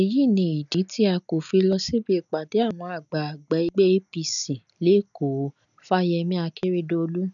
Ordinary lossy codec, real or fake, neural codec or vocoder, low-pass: none; real; none; 7.2 kHz